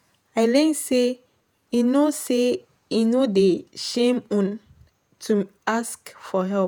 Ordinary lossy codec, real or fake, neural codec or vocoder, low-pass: none; fake; vocoder, 48 kHz, 128 mel bands, Vocos; none